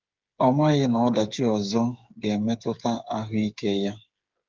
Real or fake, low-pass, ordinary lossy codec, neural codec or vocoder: fake; 7.2 kHz; Opus, 32 kbps; codec, 16 kHz, 8 kbps, FreqCodec, smaller model